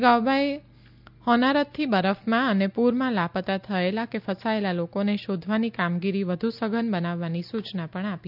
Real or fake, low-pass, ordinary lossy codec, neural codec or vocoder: real; 5.4 kHz; none; none